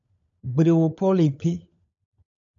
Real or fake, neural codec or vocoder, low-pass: fake; codec, 16 kHz, 16 kbps, FunCodec, trained on LibriTTS, 50 frames a second; 7.2 kHz